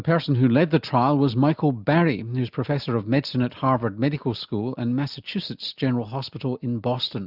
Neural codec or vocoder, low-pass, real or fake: none; 5.4 kHz; real